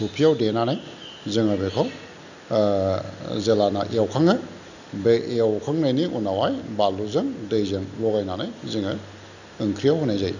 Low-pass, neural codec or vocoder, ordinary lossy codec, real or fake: 7.2 kHz; none; none; real